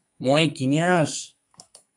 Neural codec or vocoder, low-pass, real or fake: codec, 44.1 kHz, 2.6 kbps, SNAC; 10.8 kHz; fake